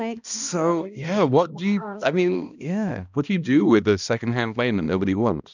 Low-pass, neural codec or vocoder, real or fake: 7.2 kHz; codec, 16 kHz, 1 kbps, X-Codec, HuBERT features, trained on balanced general audio; fake